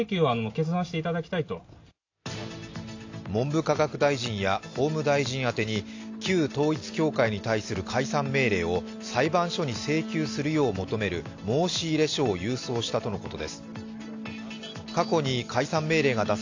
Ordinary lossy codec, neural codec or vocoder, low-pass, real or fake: AAC, 48 kbps; none; 7.2 kHz; real